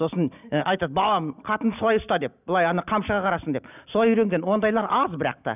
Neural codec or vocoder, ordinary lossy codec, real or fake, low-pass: none; none; real; 3.6 kHz